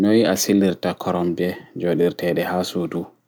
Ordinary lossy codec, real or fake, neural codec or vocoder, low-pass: none; real; none; none